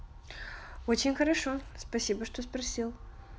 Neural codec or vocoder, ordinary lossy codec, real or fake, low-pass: none; none; real; none